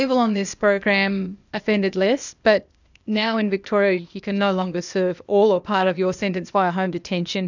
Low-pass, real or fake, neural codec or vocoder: 7.2 kHz; fake; codec, 16 kHz, 0.8 kbps, ZipCodec